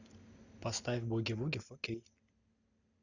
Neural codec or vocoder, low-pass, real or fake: none; 7.2 kHz; real